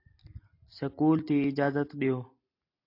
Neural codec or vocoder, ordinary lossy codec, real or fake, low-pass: none; AAC, 32 kbps; real; 5.4 kHz